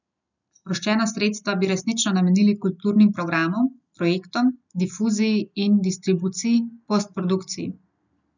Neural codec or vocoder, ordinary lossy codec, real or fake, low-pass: none; none; real; 7.2 kHz